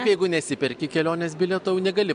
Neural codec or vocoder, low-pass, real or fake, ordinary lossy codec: none; 9.9 kHz; real; MP3, 64 kbps